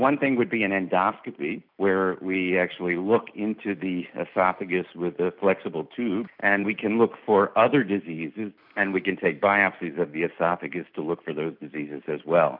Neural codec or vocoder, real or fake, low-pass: none; real; 5.4 kHz